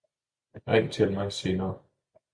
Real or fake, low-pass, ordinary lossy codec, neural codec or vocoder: real; 9.9 kHz; Opus, 64 kbps; none